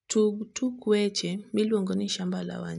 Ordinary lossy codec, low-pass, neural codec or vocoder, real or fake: none; 10.8 kHz; none; real